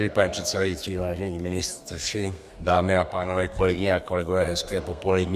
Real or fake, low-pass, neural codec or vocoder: fake; 14.4 kHz; codec, 32 kHz, 1.9 kbps, SNAC